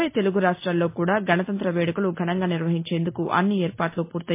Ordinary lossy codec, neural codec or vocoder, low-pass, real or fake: MP3, 24 kbps; none; 3.6 kHz; real